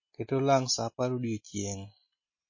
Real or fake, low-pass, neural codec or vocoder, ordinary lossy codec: real; 7.2 kHz; none; MP3, 32 kbps